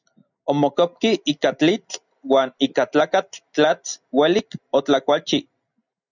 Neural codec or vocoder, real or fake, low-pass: none; real; 7.2 kHz